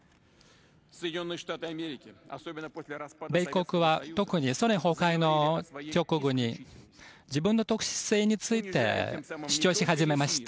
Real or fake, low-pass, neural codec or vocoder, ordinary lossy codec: real; none; none; none